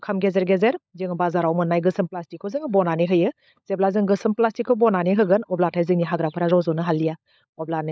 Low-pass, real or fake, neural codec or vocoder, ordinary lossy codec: none; fake; codec, 16 kHz, 16 kbps, FunCodec, trained on LibriTTS, 50 frames a second; none